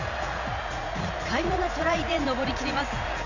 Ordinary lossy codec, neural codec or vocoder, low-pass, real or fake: none; vocoder, 44.1 kHz, 80 mel bands, Vocos; 7.2 kHz; fake